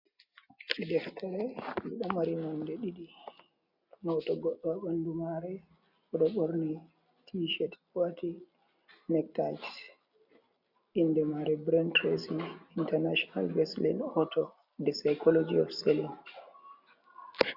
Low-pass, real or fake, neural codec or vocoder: 5.4 kHz; real; none